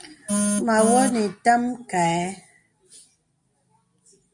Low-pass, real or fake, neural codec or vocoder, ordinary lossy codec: 10.8 kHz; real; none; MP3, 48 kbps